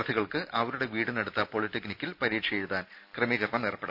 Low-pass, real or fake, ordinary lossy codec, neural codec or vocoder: 5.4 kHz; real; none; none